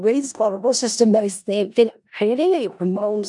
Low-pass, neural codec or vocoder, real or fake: 10.8 kHz; codec, 16 kHz in and 24 kHz out, 0.4 kbps, LongCat-Audio-Codec, four codebook decoder; fake